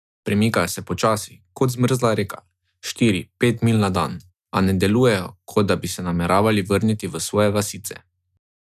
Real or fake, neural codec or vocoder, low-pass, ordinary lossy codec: real; none; 14.4 kHz; none